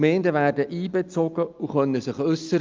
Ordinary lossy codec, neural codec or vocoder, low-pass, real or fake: Opus, 24 kbps; none; 7.2 kHz; real